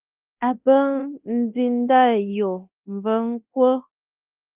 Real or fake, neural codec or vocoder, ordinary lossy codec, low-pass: fake; codec, 24 kHz, 0.9 kbps, DualCodec; Opus, 32 kbps; 3.6 kHz